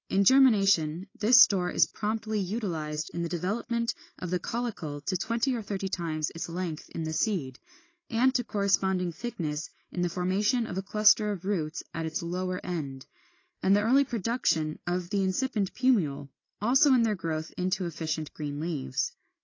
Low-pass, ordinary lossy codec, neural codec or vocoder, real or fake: 7.2 kHz; AAC, 32 kbps; none; real